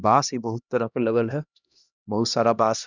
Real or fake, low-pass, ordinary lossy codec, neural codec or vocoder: fake; 7.2 kHz; none; codec, 16 kHz, 1 kbps, X-Codec, HuBERT features, trained on LibriSpeech